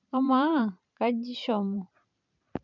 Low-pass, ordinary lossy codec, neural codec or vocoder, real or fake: 7.2 kHz; none; vocoder, 44.1 kHz, 128 mel bands every 512 samples, BigVGAN v2; fake